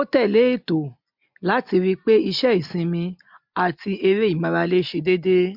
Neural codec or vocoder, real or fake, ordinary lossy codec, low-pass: none; real; MP3, 48 kbps; 5.4 kHz